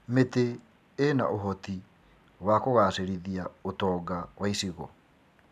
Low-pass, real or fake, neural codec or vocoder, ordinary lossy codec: 14.4 kHz; real; none; none